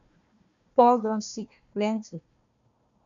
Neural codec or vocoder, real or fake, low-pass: codec, 16 kHz, 1 kbps, FunCodec, trained on Chinese and English, 50 frames a second; fake; 7.2 kHz